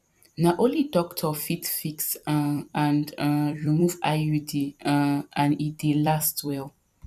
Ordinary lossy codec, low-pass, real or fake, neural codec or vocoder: none; 14.4 kHz; fake; vocoder, 44.1 kHz, 128 mel bands every 256 samples, BigVGAN v2